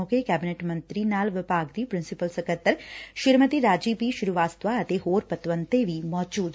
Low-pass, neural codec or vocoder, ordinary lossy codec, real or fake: none; none; none; real